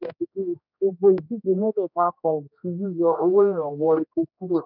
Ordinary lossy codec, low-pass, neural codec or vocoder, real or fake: none; 5.4 kHz; codec, 16 kHz, 1 kbps, X-Codec, HuBERT features, trained on general audio; fake